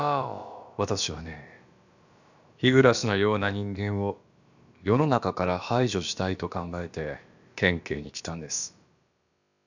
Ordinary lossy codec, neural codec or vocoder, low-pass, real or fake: none; codec, 16 kHz, about 1 kbps, DyCAST, with the encoder's durations; 7.2 kHz; fake